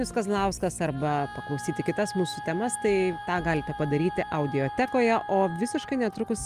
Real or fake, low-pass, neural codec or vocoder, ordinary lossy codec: real; 14.4 kHz; none; Opus, 32 kbps